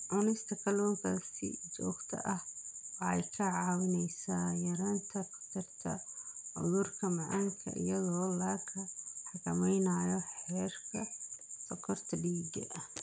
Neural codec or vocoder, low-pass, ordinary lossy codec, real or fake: none; none; none; real